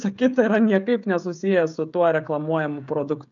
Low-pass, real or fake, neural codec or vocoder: 7.2 kHz; fake; codec, 16 kHz, 16 kbps, FreqCodec, smaller model